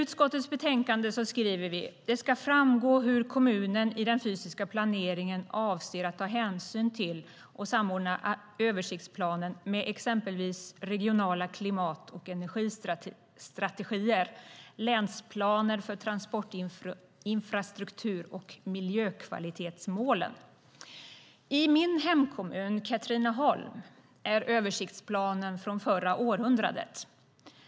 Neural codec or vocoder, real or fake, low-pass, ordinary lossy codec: none; real; none; none